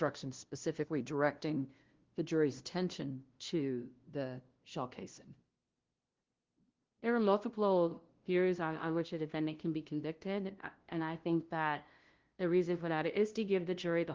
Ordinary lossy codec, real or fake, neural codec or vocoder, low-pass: Opus, 16 kbps; fake; codec, 16 kHz, 0.5 kbps, FunCodec, trained on LibriTTS, 25 frames a second; 7.2 kHz